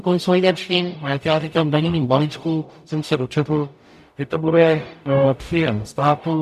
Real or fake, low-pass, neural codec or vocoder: fake; 14.4 kHz; codec, 44.1 kHz, 0.9 kbps, DAC